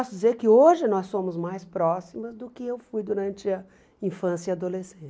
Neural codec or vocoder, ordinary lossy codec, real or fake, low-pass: none; none; real; none